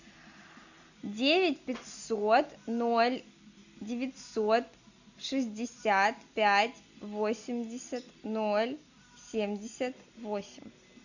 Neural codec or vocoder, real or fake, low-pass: none; real; 7.2 kHz